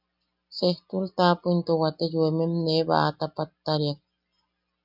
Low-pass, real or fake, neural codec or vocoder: 5.4 kHz; real; none